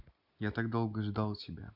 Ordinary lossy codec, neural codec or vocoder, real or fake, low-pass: none; none; real; 5.4 kHz